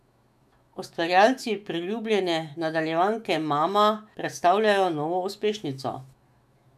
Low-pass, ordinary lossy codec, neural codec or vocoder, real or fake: 14.4 kHz; none; autoencoder, 48 kHz, 128 numbers a frame, DAC-VAE, trained on Japanese speech; fake